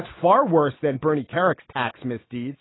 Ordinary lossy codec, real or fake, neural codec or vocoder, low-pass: AAC, 16 kbps; real; none; 7.2 kHz